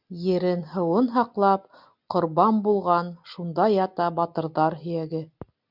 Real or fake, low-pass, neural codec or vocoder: real; 5.4 kHz; none